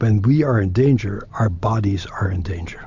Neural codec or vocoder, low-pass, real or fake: none; 7.2 kHz; real